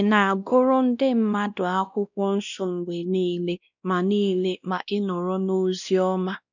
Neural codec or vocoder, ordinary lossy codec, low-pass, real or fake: codec, 16 kHz, 1 kbps, X-Codec, WavLM features, trained on Multilingual LibriSpeech; none; 7.2 kHz; fake